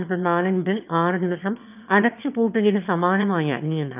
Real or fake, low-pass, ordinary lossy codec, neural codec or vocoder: fake; 3.6 kHz; none; autoencoder, 22.05 kHz, a latent of 192 numbers a frame, VITS, trained on one speaker